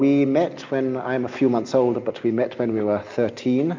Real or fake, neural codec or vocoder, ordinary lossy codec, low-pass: real; none; MP3, 64 kbps; 7.2 kHz